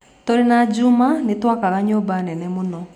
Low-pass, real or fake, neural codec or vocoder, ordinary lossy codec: 19.8 kHz; real; none; none